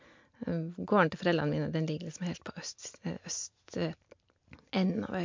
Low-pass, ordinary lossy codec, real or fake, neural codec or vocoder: 7.2 kHz; MP3, 48 kbps; real; none